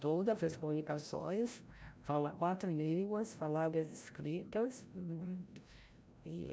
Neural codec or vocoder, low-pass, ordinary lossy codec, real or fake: codec, 16 kHz, 0.5 kbps, FreqCodec, larger model; none; none; fake